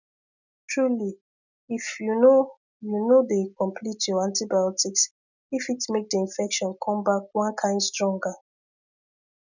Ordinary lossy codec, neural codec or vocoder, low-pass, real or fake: none; none; 7.2 kHz; real